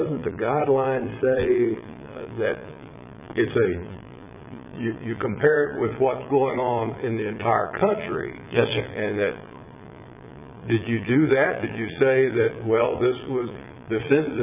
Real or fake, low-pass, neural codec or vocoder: fake; 3.6 kHz; vocoder, 22.05 kHz, 80 mel bands, Vocos